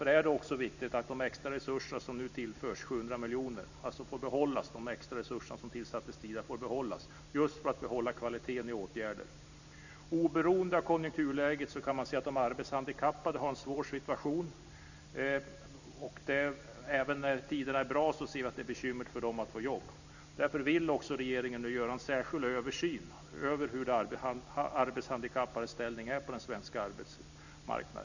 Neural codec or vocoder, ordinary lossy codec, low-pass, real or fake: none; none; 7.2 kHz; real